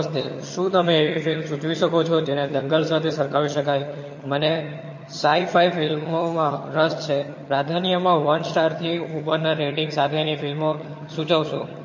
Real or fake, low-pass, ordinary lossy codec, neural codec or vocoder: fake; 7.2 kHz; MP3, 32 kbps; vocoder, 22.05 kHz, 80 mel bands, HiFi-GAN